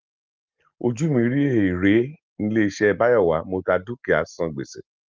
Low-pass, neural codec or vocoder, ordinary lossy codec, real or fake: 7.2 kHz; none; Opus, 32 kbps; real